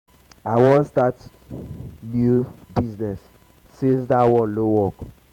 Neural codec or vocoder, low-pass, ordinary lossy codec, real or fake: vocoder, 48 kHz, 128 mel bands, Vocos; 19.8 kHz; none; fake